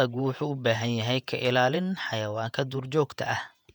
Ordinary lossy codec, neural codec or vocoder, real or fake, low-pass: none; vocoder, 48 kHz, 128 mel bands, Vocos; fake; 19.8 kHz